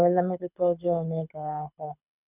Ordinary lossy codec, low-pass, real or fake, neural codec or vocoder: none; 3.6 kHz; fake; codec, 24 kHz, 6 kbps, HILCodec